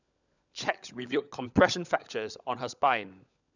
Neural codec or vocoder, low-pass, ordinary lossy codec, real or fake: codec, 16 kHz, 16 kbps, FunCodec, trained on LibriTTS, 50 frames a second; 7.2 kHz; none; fake